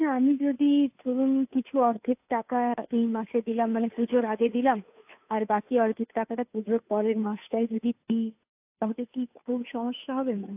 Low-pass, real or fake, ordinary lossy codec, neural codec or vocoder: 3.6 kHz; fake; AAC, 32 kbps; codec, 16 kHz, 2 kbps, FunCodec, trained on Chinese and English, 25 frames a second